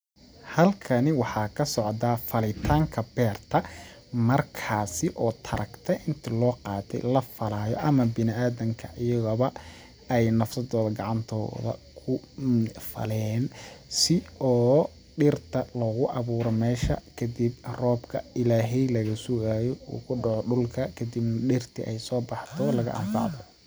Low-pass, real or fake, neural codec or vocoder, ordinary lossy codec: none; real; none; none